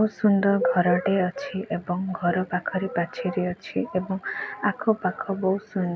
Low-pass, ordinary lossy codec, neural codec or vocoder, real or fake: none; none; none; real